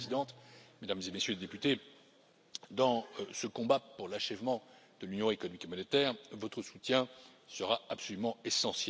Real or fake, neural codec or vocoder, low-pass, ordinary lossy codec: real; none; none; none